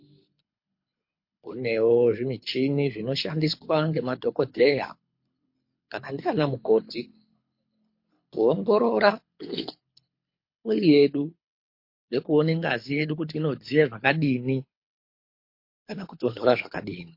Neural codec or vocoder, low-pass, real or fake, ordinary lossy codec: codec, 24 kHz, 6 kbps, HILCodec; 5.4 kHz; fake; MP3, 32 kbps